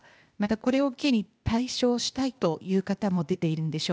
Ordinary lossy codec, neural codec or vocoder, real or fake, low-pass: none; codec, 16 kHz, 0.8 kbps, ZipCodec; fake; none